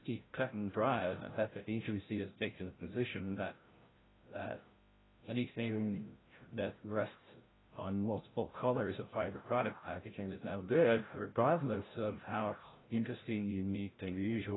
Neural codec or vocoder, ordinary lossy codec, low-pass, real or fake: codec, 16 kHz, 0.5 kbps, FreqCodec, larger model; AAC, 16 kbps; 7.2 kHz; fake